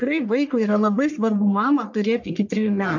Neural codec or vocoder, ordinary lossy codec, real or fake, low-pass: codec, 44.1 kHz, 1.7 kbps, Pupu-Codec; MP3, 64 kbps; fake; 7.2 kHz